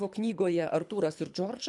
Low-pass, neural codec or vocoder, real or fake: 10.8 kHz; codec, 24 kHz, 3 kbps, HILCodec; fake